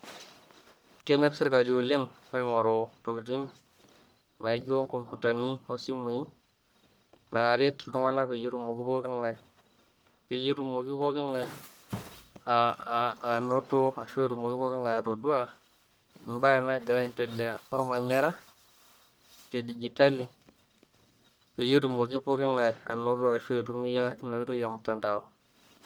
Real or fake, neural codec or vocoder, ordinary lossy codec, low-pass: fake; codec, 44.1 kHz, 1.7 kbps, Pupu-Codec; none; none